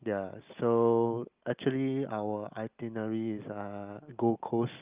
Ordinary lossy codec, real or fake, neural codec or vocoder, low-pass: Opus, 24 kbps; real; none; 3.6 kHz